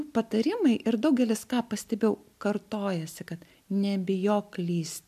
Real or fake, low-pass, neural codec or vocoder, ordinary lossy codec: real; 14.4 kHz; none; MP3, 96 kbps